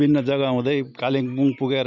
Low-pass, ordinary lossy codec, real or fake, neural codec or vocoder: 7.2 kHz; none; real; none